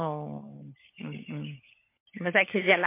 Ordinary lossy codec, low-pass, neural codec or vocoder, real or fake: MP3, 24 kbps; 3.6 kHz; codec, 16 kHz, 16 kbps, FunCodec, trained on LibriTTS, 50 frames a second; fake